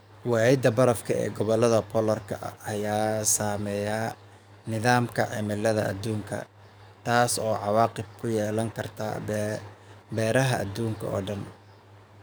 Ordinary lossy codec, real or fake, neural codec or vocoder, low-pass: none; fake; codec, 44.1 kHz, 7.8 kbps, DAC; none